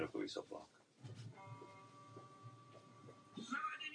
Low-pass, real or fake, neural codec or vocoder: 9.9 kHz; real; none